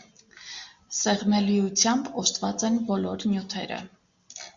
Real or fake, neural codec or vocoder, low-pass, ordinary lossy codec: real; none; 7.2 kHz; Opus, 64 kbps